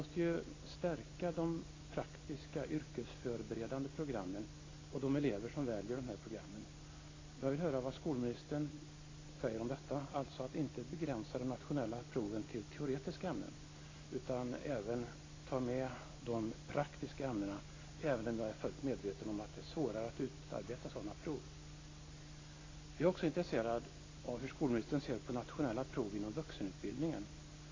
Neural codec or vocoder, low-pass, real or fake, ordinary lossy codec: none; 7.2 kHz; real; AAC, 32 kbps